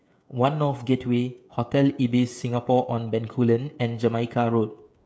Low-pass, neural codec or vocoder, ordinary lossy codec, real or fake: none; codec, 16 kHz, 16 kbps, FreqCodec, smaller model; none; fake